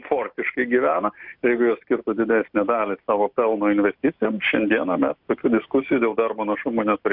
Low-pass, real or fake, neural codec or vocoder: 5.4 kHz; real; none